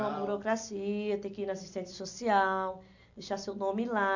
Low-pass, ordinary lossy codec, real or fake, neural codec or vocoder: 7.2 kHz; none; real; none